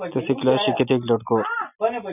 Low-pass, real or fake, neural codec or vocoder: 3.6 kHz; real; none